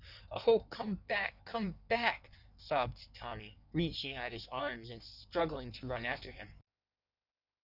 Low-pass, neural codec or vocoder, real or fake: 5.4 kHz; codec, 16 kHz in and 24 kHz out, 1.1 kbps, FireRedTTS-2 codec; fake